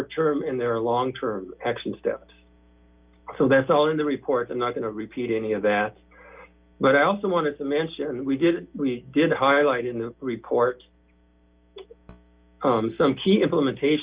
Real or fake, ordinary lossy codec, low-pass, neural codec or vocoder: real; Opus, 24 kbps; 3.6 kHz; none